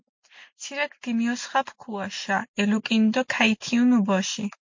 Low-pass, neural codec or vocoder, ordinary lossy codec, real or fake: 7.2 kHz; none; MP3, 48 kbps; real